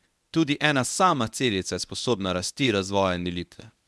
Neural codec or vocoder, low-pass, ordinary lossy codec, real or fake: codec, 24 kHz, 0.9 kbps, WavTokenizer, medium speech release version 1; none; none; fake